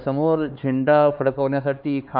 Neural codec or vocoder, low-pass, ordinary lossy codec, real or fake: codec, 16 kHz, 4 kbps, X-Codec, HuBERT features, trained on LibriSpeech; 5.4 kHz; MP3, 48 kbps; fake